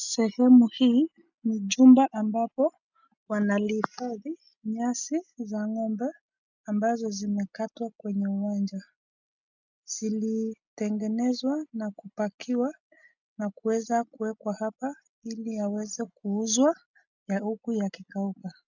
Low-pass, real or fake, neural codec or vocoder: 7.2 kHz; real; none